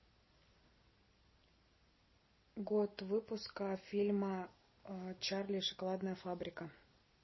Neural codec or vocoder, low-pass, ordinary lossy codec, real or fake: none; 7.2 kHz; MP3, 24 kbps; real